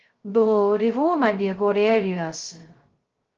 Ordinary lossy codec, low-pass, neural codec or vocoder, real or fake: Opus, 16 kbps; 7.2 kHz; codec, 16 kHz, 0.2 kbps, FocalCodec; fake